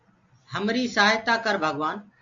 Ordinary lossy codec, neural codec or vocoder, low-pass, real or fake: MP3, 64 kbps; none; 7.2 kHz; real